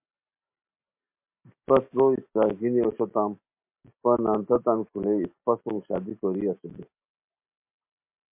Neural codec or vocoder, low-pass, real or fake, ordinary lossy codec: none; 3.6 kHz; real; MP3, 32 kbps